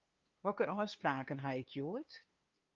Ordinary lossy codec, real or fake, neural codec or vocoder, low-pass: Opus, 32 kbps; fake; codec, 16 kHz, 2 kbps, FunCodec, trained on LibriTTS, 25 frames a second; 7.2 kHz